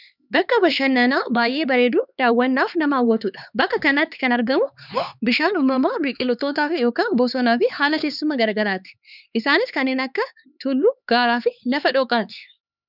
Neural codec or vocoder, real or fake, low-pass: codec, 16 kHz, 4 kbps, X-Codec, HuBERT features, trained on LibriSpeech; fake; 5.4 kHz